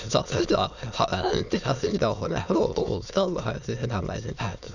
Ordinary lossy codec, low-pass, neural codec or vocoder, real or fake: none; 7.2 kHz; autoencoder, 22.05 kHz, a latent of 192 numbers a frame, VITS, trained on many speakers; fake